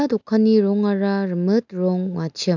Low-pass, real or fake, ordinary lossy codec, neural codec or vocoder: 7.2 kHz; real; none; none